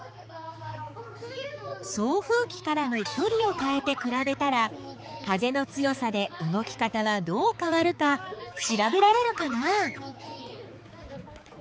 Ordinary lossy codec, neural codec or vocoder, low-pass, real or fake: none; codec, 16 kHz, 4 kbps, X-Codec, HuBERT features, trained on balanced general audio; none; fake